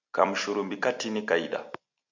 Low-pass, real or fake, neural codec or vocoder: 7.2 kHz; real; none